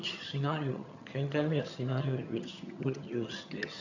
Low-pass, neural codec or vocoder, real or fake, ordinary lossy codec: 7.2 kHz; vocoder, 22.05 kHz, 80 mel bands, HiFi-GAN; fake; none